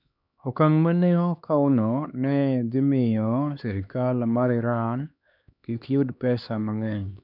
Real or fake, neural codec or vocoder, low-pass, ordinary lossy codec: fake; codec, 16 kHz, 2 kbps, X-Codec, HuBERT features, trained on LibriSpeech; 5.4 kHz; none